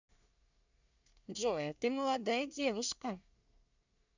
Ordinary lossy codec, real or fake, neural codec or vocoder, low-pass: none; fake; codec, 24 kHz, 1 kbps, SNAC; 7.2 kHz